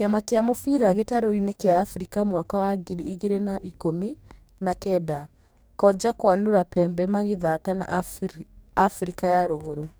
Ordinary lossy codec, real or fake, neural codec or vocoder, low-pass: none; fake; codec, 44.1 kHz, 2.6 kbps, DAC; none